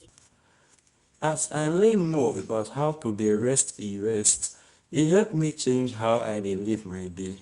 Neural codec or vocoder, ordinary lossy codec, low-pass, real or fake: codec, 24 kHz, 0.9 kbps, WavTokenizer, medium music audio release; Opus, 64 kbps; 10.8 kHz; fake